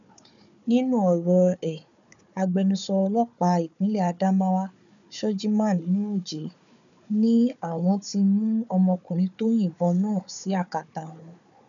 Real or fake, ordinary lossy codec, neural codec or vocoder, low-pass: fake; none; codec, 16 kHz, 4 kbps, FunCodec, trained on Chinese and English, 50 frames a second; 7.2 kHz